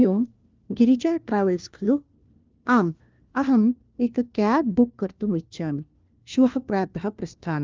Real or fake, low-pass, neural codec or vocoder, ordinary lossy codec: fake; 7.2 kHz; codec, 16 kHz, 1 kbps, FunCodec, trained on LibriTTS, 50 frames a second; Opus, 24 kbps